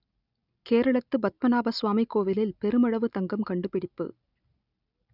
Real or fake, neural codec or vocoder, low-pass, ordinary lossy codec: real; none; 5.4 kHz; none